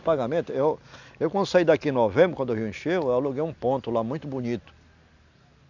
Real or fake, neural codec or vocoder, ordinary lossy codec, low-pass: real; none; none; 7.2 kHz